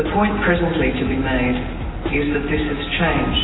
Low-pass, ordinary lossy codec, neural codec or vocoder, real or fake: 7.2 kHz; AAC, 16 kbps; none; real